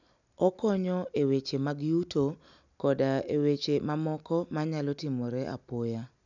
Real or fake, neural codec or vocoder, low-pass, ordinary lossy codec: real; none; 7.2 kHz; none